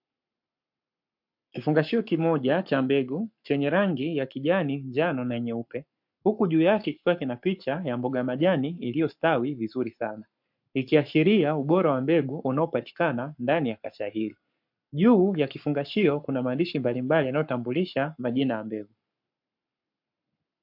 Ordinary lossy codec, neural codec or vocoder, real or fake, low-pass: MP3, 48 kbps; codec, 44.1 kHz, 7.8 kbps, Pupu-Codec; fake; 5.4 kHz